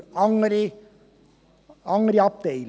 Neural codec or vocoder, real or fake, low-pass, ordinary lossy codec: none; real; none; none